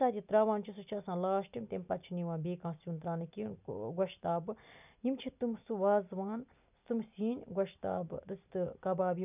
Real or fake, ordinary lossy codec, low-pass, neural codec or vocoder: real; none; 3.6 kHz; none